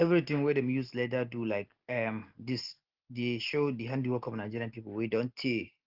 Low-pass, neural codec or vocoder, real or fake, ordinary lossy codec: 5.4 kHz; none; real; Opus, 16 kbps